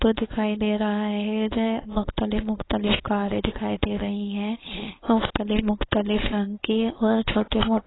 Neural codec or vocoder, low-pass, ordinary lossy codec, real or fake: codec, 16 kHz, 4.8 kbps, FACodec; 7.2 kHz; AAC, 16 kbps; fake